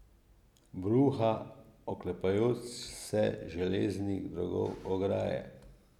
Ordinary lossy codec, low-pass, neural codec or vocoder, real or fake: none; 19.8 kHz; none; real